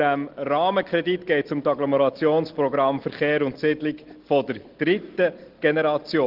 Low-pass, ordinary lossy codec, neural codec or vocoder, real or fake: 5.4 kHz; Opus, 16 kbps; none; real